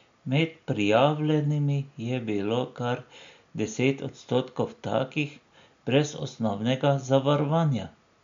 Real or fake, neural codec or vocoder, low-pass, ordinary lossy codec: real; none; 7.2 kHz; MP3, 64 kbps